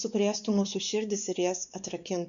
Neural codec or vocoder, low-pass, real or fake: codec, 16 kHz, 4 kbps, X-Codec, WavLM features, trained on Multilingual LibriSpeech; 7.2 kHz; fake